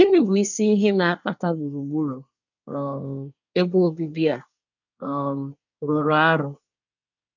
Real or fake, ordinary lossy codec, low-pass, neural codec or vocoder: fake; none; 7.2 kHz; codec, 24 kHz, 1 kbps, SNAC